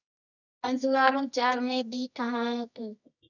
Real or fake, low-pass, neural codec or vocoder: fake; 7.2 kHz; codec, 24 kHz, 0.9 kbps, WavTokenizer, medium music audio release